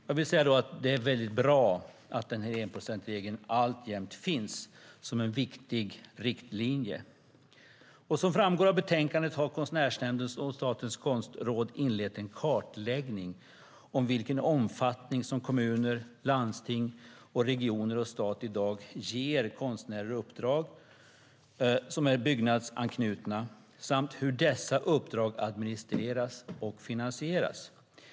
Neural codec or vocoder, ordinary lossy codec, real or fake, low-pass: none; none; real; none